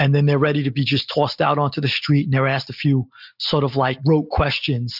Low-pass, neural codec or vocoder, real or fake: 5.4 kHz; none; real